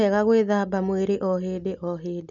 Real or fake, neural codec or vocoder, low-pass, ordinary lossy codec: real; none; 7.2 kHz; none